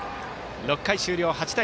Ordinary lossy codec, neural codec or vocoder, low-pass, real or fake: none; none; none; real